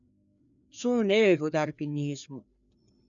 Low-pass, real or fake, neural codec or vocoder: 7.2 kHz; fake; codec, 16 kHz, 2 kbps, FreqCodec, larger model